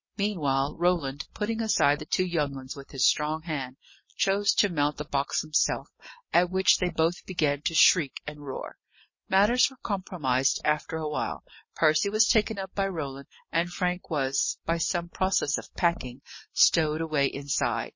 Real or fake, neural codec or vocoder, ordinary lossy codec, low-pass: real; none; MP3, 32 kbps; 7.2 kHz